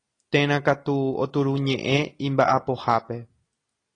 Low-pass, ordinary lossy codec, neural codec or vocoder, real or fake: 9.9 kHz; AAC, 32 kbps; none; real